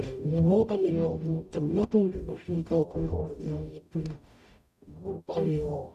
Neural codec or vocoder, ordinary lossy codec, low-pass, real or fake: codec, 44.1 kHz, 0.9 kbps, DAC; none; 14.4 kHz; fake